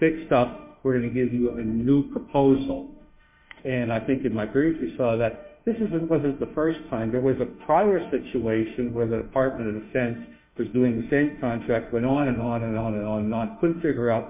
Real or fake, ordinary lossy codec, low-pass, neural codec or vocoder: fake; MP3, 32 kbps; 3.6 kHz; autoencoder, 48 kHz, 32 numbers a frame, DAC-VAE, trained on Japanese speech